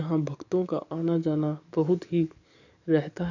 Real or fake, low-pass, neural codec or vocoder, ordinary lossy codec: fake; 7.2 kHz; codec, 44.1 kHz, 7.8 kbps, DAC; none